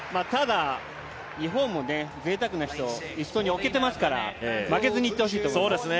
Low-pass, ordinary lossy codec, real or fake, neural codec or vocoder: none; none; real; none